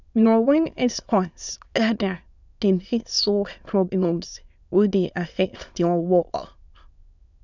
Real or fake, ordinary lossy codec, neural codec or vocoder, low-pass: fake; none; autoencoder, 22.05 kHz, a latent of 192 numbers a frame, VITS, trained on many speakers; 7.2 kHz